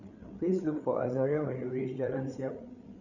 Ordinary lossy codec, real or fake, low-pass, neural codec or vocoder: none; fake; 7.2 kHz; codec, 16 kHz, 8 kbps, FreqCodec, larger model